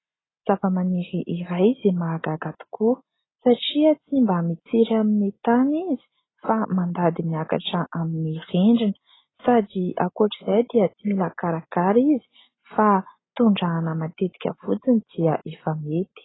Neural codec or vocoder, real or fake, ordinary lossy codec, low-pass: none; real; AAC, 16 kbps; 7.2 kHz